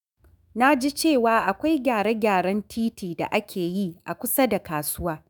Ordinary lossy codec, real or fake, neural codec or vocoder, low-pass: none; fake; autoencoder, 48 kHz, 128 numbers a frame, DAC-VAE, trained on Japanese speech; none